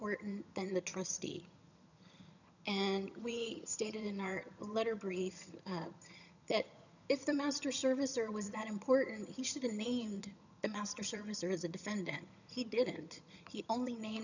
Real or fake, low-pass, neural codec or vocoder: fake; 7.2 kHz; vocoder, 22.05 kHz, 80 mel bands, HiFi-GAN